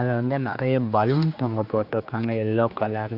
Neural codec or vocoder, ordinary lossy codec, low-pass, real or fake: codec, 16 kHz, 2 kbps, X-Codec, HuBERT features, trained on general audio; none; 5.4 kHz; fake